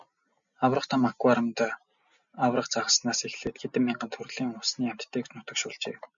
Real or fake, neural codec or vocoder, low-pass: real; none; 7.2 kHz